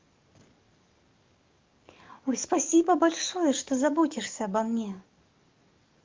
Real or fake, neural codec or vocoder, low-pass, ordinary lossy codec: fake; codec, 44.1 kHz, 7.8 kbps, DAC; 7.2 kHz; Opus, 32 kbps